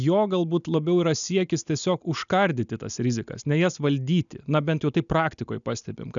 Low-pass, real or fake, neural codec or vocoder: 7.2 kHz; real; none